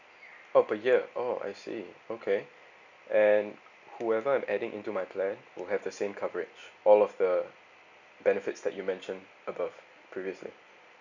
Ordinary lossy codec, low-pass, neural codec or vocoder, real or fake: none; 7.2 kHz; none; real